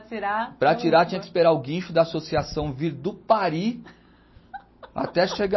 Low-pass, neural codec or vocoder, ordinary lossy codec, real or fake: 7.2 kHz; none; MP3, 24 kbps; real